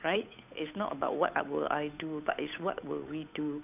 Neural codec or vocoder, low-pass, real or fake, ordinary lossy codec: codec, 16 kHz, 8 kbps, FunCodec, trained on Chinese and English, 25 frames a second; 3.6 kHz; fake; none